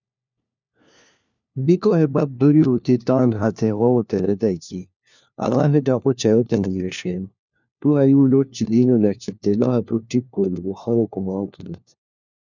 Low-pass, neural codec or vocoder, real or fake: 7.2 kHz; codec, 16 kHz, 1 kbps, FunCodec, trained on LibriTTS, 50 frames a second; fake